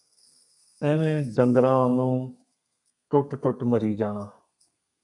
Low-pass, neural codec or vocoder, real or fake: 10.8 kHz; codec, 32 kHz, 1.9 kbps, SNAC; fake